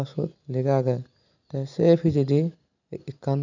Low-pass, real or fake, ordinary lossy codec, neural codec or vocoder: 7.2 kHz; real; none; none